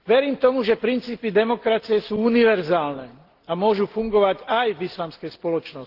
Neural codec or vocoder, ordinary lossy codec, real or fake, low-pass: none; Opus, 16 kbps; real; 5.4 kHz